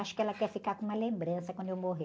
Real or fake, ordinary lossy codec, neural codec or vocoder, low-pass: real; none; none; none